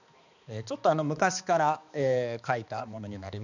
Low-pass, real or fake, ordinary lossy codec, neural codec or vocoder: 7.2 kHz; fake; none; codec, 16 kHz, 2 kbps, X-Codec, HuBERT features, trained on balanced general audio